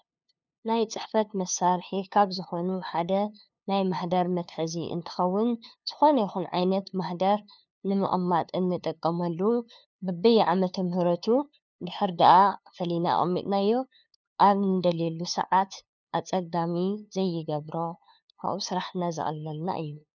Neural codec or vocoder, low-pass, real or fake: codec, 16 kHz, 2 kbps, FunCodec, trained on LibriTTS, 25 frames a second; 7.2 kHz; fake